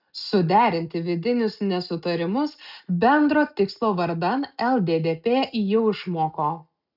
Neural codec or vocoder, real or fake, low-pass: none; real; 5.4 kHz